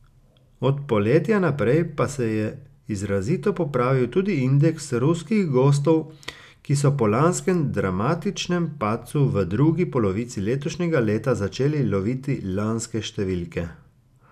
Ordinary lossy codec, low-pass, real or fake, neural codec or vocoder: none; 14.4 kHz; real; none